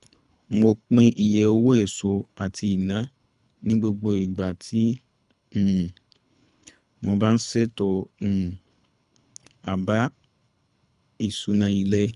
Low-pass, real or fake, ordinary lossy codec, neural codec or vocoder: 10.8 kHz; fake; none; codec, 24 kHz, 3 kbps, HILCodec